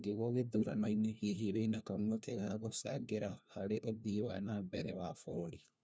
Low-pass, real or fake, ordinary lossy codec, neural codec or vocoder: none; fake; none; codec, 16 kHz, 1 kbps, FunCodec, trained on LibriTTS, 50 frames a second